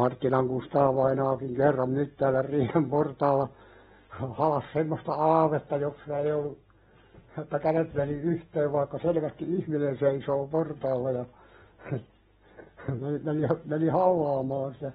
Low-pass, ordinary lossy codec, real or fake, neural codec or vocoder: 19.8 kHz; AAC, 16 kbps; real; none